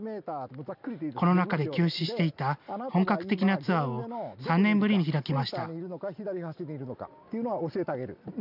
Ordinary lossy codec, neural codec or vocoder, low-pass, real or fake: none; none; 5.4 kHz; real